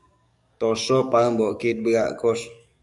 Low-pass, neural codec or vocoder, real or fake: 10.8 kHz; codec, 44.1 kHz, 7.8 kbps, DAC; fake